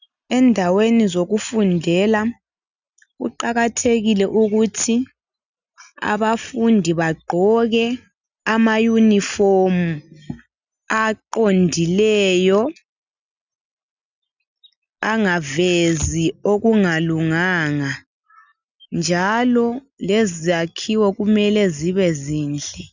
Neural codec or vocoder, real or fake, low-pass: none; real; 7.2 kHz